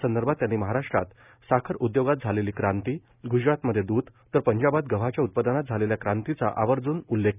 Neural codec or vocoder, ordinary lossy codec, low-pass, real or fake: none; none; 3.6 kHz; real